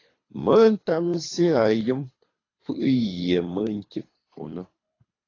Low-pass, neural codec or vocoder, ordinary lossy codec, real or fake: 7.2 kHz; codec, 24 kHz, 3 kbps, HILCodec; AAC, 32 kbps; fake